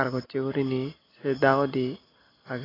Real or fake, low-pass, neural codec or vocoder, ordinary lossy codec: real; 5.4 kHz; none; AAC, 24 kbps